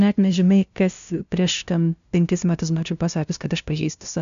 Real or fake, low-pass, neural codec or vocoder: fake; 7.2 kHz; codec, 16 kHz, 0.5 kbps, FunCodec, trained on LibriTTS, 25 frames a second